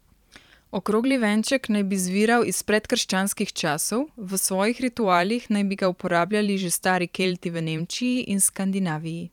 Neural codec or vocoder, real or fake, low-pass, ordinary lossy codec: vocoder, 44.1 kHz, 128 mel bands every 512 samples, BigVGAN v2; fake; 19.8 kHz; none